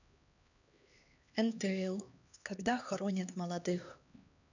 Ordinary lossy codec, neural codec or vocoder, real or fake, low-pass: none; codec, 16 kHz, 2 kbps, X-Codec, HuBERT features, trained on LibriSpeech; fake; 7.2 kHz